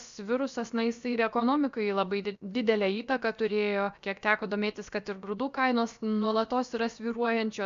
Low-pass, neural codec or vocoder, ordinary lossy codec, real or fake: 7.2 kHz; codec, 16 kHz, about 1 kbps, DyCAST, with the encoder's durations; Opus, 64 kbps; fake